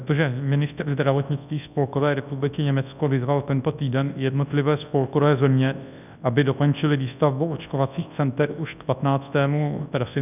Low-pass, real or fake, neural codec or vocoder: 3.6 kHz; fake; codec, 24 kHz, 0.9 kbps, WavTokenizer, large speech release